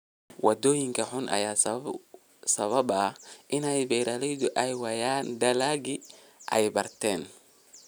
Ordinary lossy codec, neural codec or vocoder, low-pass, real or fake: none; none; none; real